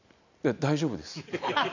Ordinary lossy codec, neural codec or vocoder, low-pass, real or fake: none; none; 7.2 kHz; real